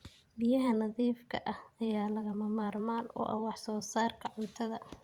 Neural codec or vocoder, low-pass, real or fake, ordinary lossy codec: vocoder, 44.1 kHz, 128 mel bands every 512 samples, BigVGAN v2; 19.8 kHz; fake; Opus, 64 kbps